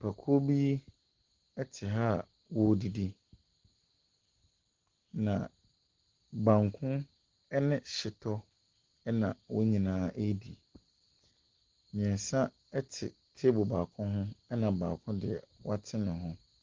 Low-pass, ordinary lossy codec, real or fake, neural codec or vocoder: 7.2 kHz; Opus, 16 kbps; real; none